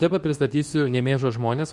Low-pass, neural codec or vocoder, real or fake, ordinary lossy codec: 10.8 kHz; codec, 24 kHz, 0.9 kbps, WavTokenizer, medium speech release version 2; fake; Opus, 64 kbps